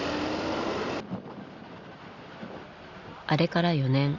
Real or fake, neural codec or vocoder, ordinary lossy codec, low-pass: real; none; Opus, 64 kbps; 7.2 kHz